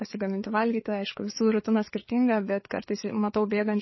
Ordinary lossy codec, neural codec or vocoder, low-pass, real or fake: MP3, 24 kbps; codec, 16 kHz, 4 kbps, FreqCodec, larger model; 7.2 kHz; fake